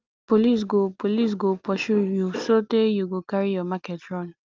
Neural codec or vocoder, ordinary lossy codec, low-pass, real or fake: none; Opus, 24 kbps; 7.2 kHz; real